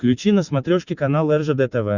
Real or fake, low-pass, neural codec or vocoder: real; 7.2 kHz; none